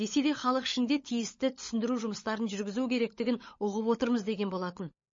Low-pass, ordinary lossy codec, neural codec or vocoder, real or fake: 7.2 kHz; MP3, 32 kbps; codec, 16 kHz, 16 kbps, FunCodec, trained on LibriTTS, 50 frames a second; fake